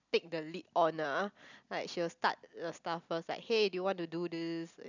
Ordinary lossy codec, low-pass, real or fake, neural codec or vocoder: none; 7.2 kHz; real; none